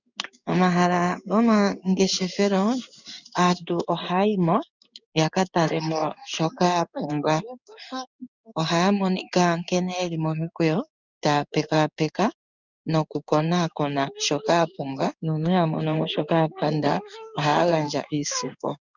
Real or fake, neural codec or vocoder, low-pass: fake; codec, 16 kHz in and 24 kHz out, 1 kbps, XY-Tokenizer; 7.2 kHz